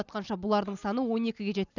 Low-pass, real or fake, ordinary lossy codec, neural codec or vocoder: 7.2 kHz; real; none; none